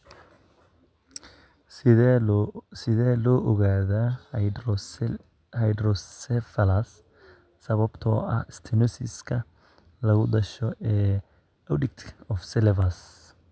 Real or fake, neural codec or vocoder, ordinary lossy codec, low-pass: real; none; none; none